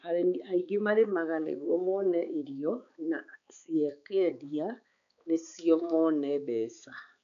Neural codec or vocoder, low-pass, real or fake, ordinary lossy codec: codec, 16 kHz, 4 kbps, X-Codec, HuBERT features, trained on balanced general audio; 7.2 kHz; fake; none